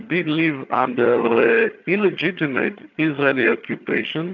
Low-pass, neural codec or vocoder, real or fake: 7.2 kHz; vocoder, 22.05 kHz, 80 mel bands, HiFi-GAN; fake